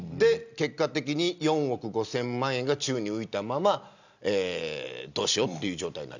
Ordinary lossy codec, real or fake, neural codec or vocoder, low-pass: none; real; none; 7.2 kHz